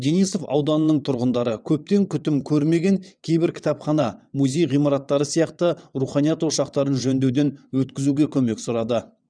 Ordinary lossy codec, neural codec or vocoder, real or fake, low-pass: none; vocoder, 22.05 kHz, 80 mel bands, WaveNeXt; fake; 9.9 kHz